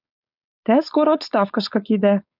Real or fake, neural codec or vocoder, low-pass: fake; codec, 16 kHz, 4.8 kbps, FACodec; 5.4 kHz